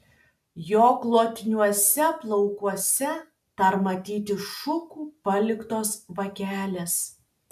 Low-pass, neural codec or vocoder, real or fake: 14.4 kHz; none; real